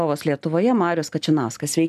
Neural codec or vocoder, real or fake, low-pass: none; real; 14.4 kHz